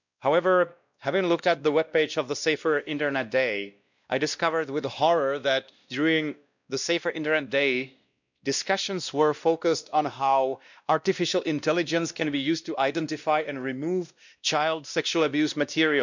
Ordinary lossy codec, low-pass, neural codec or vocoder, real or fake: none; 7.2 kHz; codec, 16 kHz, 1 kbps, X-Codec, WavLM features, trained on Multilingual LibriSpeech; fake